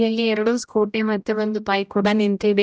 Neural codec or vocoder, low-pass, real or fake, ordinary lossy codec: codec, 16 kHz, 0.5 kbps, X-Codec, HuBERT features, trained on general audio; none; fake; none